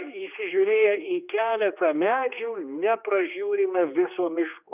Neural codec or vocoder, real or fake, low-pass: codec, 16 kHz, 2 kbps, X-Codec, HuBERT features, trained on general audio; fake; 3.6 kHz